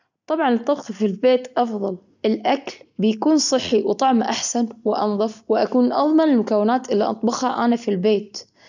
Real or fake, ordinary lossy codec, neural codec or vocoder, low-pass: real; none; none; 7.2 kHz